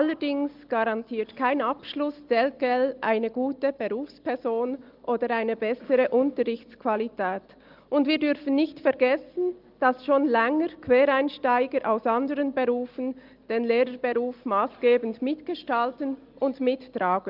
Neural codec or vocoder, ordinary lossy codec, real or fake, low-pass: none; Opus, 32 kbps; real; 5.4 kHz